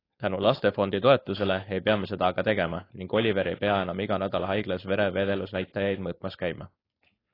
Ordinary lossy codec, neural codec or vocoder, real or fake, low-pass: AAC, 24 kbps; codec, 16 kHz, 4.8 kbps, FACodec; fake; 5.4 kHz